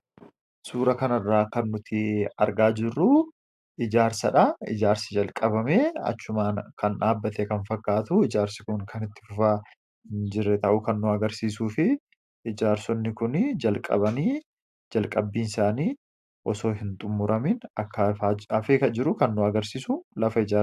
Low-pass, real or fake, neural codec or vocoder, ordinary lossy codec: 14.4 kHz; real; none; AAC, 96 kbps